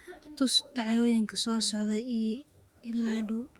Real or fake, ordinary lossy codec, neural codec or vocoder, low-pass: fake; Opus, 64 kbps; autoencoder, 48 kHz, 32 numbers a frame, DAC-VAE, trained on Japanese speech; 19.8 kHz